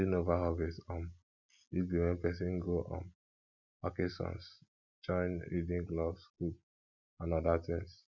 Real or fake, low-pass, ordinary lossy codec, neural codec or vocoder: real; 7.2 kHz; none; none